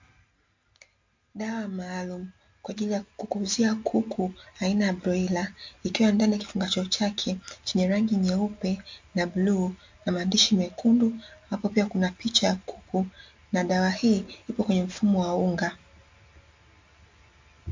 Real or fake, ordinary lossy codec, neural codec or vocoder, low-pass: real; MP3, 48 kbps; none; 7.2 kHz